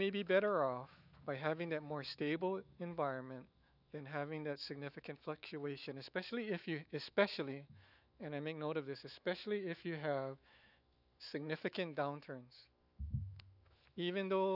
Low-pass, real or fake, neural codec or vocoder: 5.4 kHz; fake; autoencoder, 48 kHz, 128 numbers a frame, DAC-VAE, trained on Japanese speech